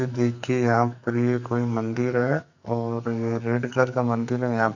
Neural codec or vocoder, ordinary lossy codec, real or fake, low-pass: codec, 44.1 kHz, 2.6 kbps, SNAC; none; fake; 7.2 kHz